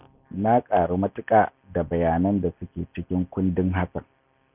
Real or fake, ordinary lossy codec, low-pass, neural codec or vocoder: real; none; 3.6 kHz; none